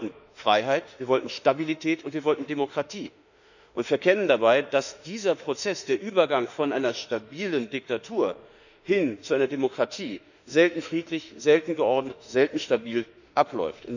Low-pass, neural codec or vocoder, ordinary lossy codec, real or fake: 7.2 kHz; autoencoder, 48 kHz, 32 numbers a frame, DAC-VAE, trained on Japanese speech; none; fake